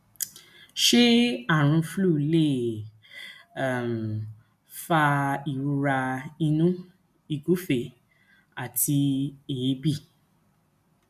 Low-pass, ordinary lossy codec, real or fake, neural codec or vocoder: 14.4 kHz; none; real; none